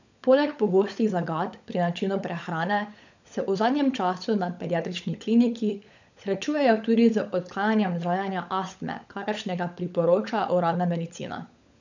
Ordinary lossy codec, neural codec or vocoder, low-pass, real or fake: none; codec, 16 kHz, 16 kbps, FunCodec, trained on LibriTTS, 50 frames a second; 7.2 kHz; fake